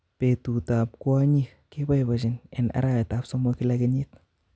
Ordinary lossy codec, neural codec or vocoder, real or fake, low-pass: none; none; real; none